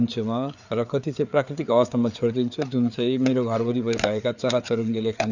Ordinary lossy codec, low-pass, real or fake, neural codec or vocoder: none; 7.2 kHz; fake; codec, 16 kHz, 4 kbps, FreqCodec, larger model